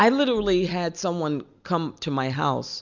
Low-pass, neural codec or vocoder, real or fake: 7.2 kHz; none; real